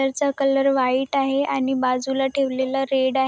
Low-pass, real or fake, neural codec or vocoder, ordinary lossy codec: none; real; none; none